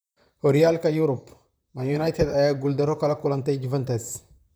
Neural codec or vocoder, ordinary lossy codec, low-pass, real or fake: vocoder, 44.1 kHz, 128 mel bands, Pupu-Vocoder; none; none; fake